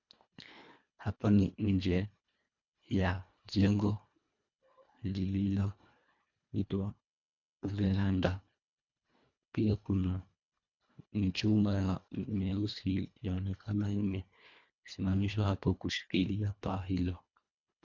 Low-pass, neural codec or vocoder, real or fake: 7.2 kHz; codec, 24 kHz, 1.5 kbps, HILCodec; fake